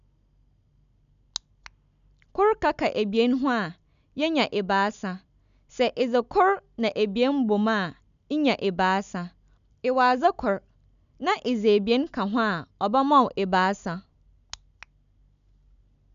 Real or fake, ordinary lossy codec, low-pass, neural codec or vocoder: real; none; 7.2 kHz; none